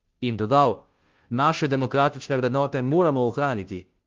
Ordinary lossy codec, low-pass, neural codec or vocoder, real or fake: Opus, 32 kbps; 7.2 kHz; codec, 16 kHz, 0.5 kbps, FunCodec, trained on Chinese and English, 25 frames a second; fake